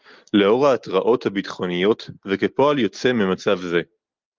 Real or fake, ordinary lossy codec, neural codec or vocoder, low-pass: real; Opus, 32 kbps; none; 7.2 kHz